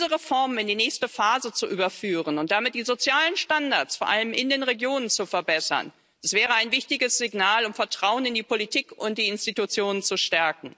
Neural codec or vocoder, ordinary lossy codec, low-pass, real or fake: none; none; none; real